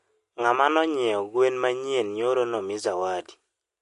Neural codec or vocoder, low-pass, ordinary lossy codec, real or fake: none; 10.8 kHz; MP3, 48 kbps; real